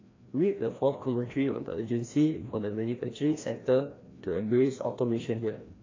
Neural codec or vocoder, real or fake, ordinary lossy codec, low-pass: codec, 16 kHz, 1 kbps, FreqCodec, larger model; fake; AAC, 32 kbps; 7.2 kHz